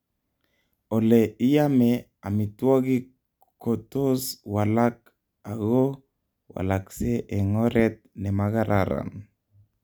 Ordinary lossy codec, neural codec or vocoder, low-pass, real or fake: none; none; none; real